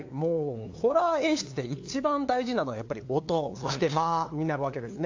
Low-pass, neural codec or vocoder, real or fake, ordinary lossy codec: 7.2 kHz; codec, 16 kHz, 2 kbps, FunCodec, trained on LibriTTS, 25 frames a second; fake; AAC, 48 kbps